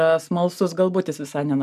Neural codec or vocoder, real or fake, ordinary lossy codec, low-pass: none; real; MP3, 96 kbps; 14.4 kHz